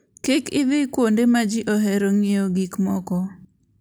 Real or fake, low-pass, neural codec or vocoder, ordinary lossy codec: real; none; none; none